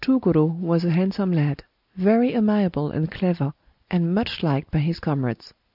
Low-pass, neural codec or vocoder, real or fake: 5.4 kHz; none; real